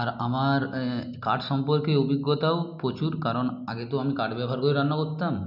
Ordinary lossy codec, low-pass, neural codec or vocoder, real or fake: none; 5.4 kHz; none; real